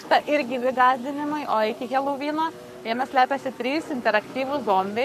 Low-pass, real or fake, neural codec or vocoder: 14.4 kHz; fake; codec, 44.1 kHz, 7.8 kbps, Pupu-Codec